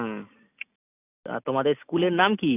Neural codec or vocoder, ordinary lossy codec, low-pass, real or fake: none; none; 3.6 kHz; real